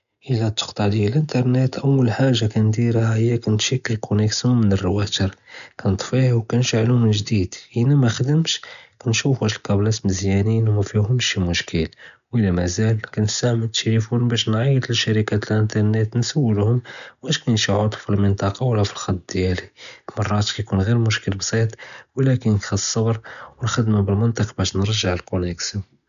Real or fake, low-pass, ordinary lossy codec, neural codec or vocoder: real; 7.2 kHz; none; none